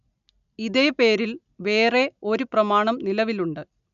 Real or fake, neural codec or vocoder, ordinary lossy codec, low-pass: real; none; none; 7.2 kHz